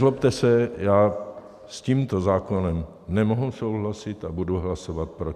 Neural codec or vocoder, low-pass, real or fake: none; 14.4 kHz; real